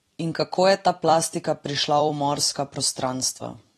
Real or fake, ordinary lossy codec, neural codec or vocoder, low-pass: fake; AAC, 32 kbps; vocoder, 44.1 kHz, 128 mel bands every 256 samples, BigVGAN v2; 19.8 kHz